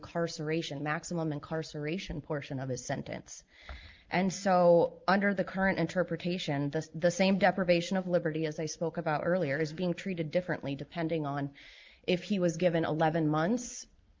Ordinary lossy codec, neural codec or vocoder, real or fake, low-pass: Opus, 32 kbps; none; real; 7.2 kHz